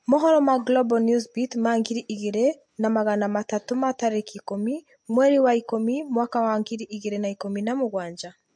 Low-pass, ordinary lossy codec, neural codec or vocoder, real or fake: 9.9 kHz; MP3, 48 kbps; none; real